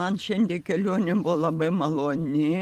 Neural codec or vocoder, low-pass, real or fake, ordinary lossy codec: vocoder, 44.1 kHz, 128 mel bands every 256 samples, BigVGAN v2; 14.4 kHz; fake; Opus, 24 kbps